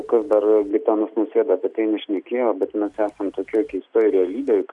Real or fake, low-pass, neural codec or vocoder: real; 10.8 kHz; none